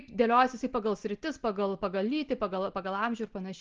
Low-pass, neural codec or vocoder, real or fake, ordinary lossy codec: 7.2 kHz; none; real; Opus, 16 kbps